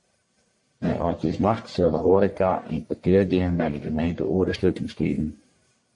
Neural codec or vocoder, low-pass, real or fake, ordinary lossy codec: codec, 44.1 kHz, 1.7 kbps, Pupu-Codec; 10.8 kHz; fake; MP3, 48 kbps